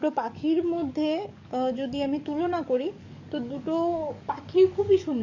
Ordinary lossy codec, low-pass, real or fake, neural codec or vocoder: none; 7.2 kHz; fake; codec, 44.1 kHz, 7.8 kbps, DAC